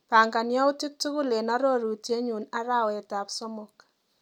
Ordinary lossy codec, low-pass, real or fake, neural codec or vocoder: none; 19.8 kHz; real; none